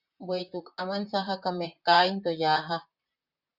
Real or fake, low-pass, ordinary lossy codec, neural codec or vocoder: fake; 5.4 kHz; Opus, 64 kbps; vocoder, 44.1 kHz, 128 mel bands, Pupu-Vocoder